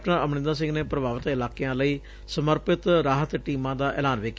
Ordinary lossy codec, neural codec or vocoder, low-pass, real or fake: none; none; none; real